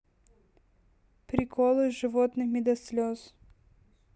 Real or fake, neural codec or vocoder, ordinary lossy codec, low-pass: real; none; none; none